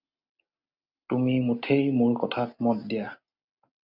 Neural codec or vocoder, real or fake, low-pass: none; real; 5.4 kHz